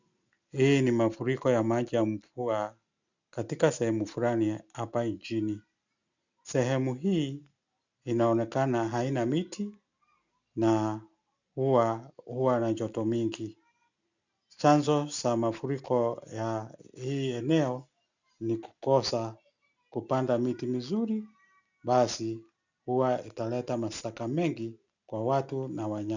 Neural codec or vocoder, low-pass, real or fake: none; 7.2 kHz; real